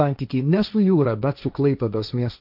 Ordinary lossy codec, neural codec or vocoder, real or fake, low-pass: AAC, 48 kbps; codec, 16 kHz, 1.1 kbps, Voila-Tokenizer; fake; 5.4 kHz